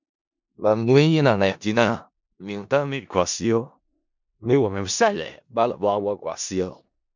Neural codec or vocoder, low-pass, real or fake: codec, 16 kHz in and 24 kHz out, 0.4 kbps, LongCat-Audio-Codec, four codebook decoder; 7.2 kHz; fake